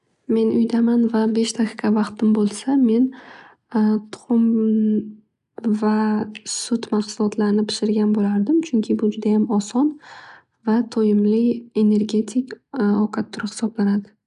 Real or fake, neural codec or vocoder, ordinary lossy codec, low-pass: real; none; none; 10.8 kHz